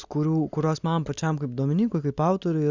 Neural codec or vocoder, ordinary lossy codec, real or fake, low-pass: none; Opus, 64 kbps; real; 7.2 kHz